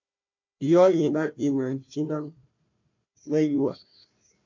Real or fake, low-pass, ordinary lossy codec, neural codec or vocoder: fake; 7.2 kHz; MP3, 48 kbps; codec, 16 kHz, 1 kbps, FunCodec, trained on Chinese and English, 50 frames a second